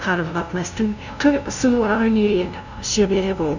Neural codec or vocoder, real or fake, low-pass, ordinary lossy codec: codec, 16 kHz, 0.5 kbps, FunCodec, trained on LibriTTS, 25 frames a second; fake; 7.2 kHz; none